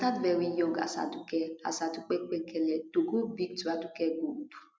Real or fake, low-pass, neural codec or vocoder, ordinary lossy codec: real; none; none; none